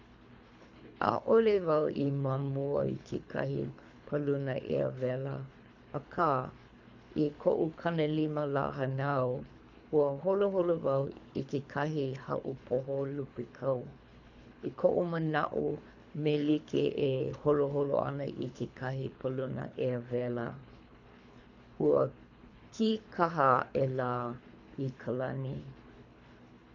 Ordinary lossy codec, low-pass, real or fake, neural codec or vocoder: none; 7.2 kHz; fake; codec, 24 kHz, 3 kbps, HILCodec